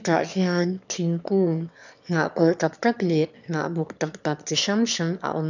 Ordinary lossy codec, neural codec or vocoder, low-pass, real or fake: none; autoencoder, 22.05 kHz, a latent of 192 numbers a frame, VITS, trained on one speaker; 7.2 kHz; fake